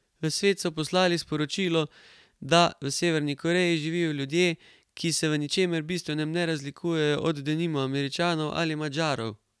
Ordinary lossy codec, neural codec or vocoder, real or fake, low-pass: none; none; real; none